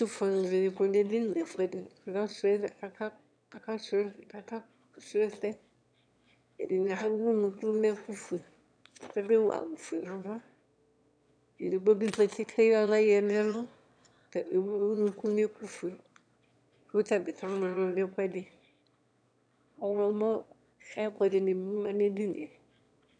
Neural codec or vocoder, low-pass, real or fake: autoencoder, 22.05 kHz, a latent of 192 numbers a frame, VITS, trained on one speaker; 9.9 kHz; fake